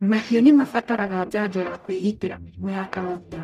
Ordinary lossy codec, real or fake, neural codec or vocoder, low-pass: none; fake; codec, 44.1 kHz, 0.9 kbps, DAC; 14.4 kHz